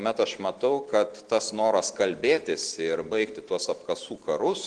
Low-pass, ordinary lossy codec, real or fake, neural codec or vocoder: 9.9 kHz; Opus, 16 kbps; real; none